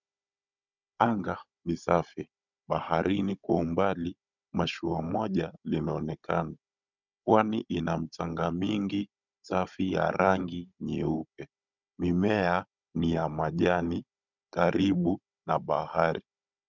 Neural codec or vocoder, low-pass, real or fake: codec, 16 kHz, 16 kbps, FunCodec, trained on Chinese and English, 50 frames a second; 7.2 kHz; fake